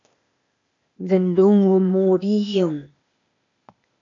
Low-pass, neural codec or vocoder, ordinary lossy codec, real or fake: 7.2 kHz; codec, 16 kHz, 0.8 kbps, ZipCodec; AAC, 64 kbps; fake